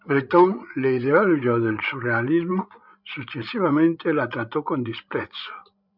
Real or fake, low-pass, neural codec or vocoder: fake; 5.4 kHz; codec, 16 kHz, 16 kbps, FreqCodec, larger model